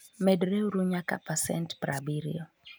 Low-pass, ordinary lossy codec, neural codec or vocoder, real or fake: none; none; none; real